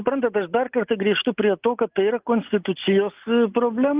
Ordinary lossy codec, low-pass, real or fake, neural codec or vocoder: Opus, 24 kbps; 3.6 kHz; real; none